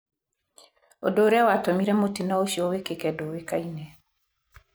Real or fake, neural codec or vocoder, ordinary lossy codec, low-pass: real; none; none; none